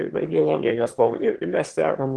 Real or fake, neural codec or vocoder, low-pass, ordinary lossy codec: fake; autoencoder, 22.05 kHz, a latent of 192 numbers a frame, VITS, trained on one speaker; 9.9 kHz; Opus, 16 kbps